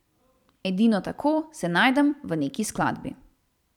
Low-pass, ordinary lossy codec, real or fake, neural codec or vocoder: 19.8 kHz; none; fake; vocoder, 44.1 kHz, 128 mel bands every 256 samples, BigVGAN v2